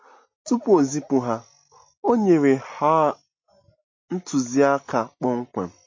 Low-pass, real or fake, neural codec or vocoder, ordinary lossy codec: 7.2 kHz; real; none; MP3, 32 kbps